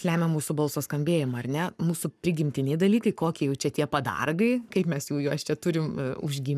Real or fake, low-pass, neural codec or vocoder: fake; 14.4 kHz; codec, 44.1 kHz, 7.8 kbps, Pupu-Codec